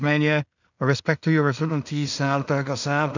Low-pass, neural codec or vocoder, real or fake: 7.2 kHz; codec, 16 kHz in and 24 kHz out, 0.4 kbps, LongCat-Audio-Codec, two codebook decoder; fake